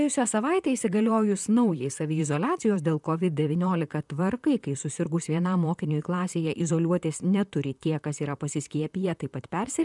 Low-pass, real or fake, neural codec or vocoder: 10.8 kHz; fake; vocoder, 44.1 kHz, 128 mel bands, Pupu-Vocoder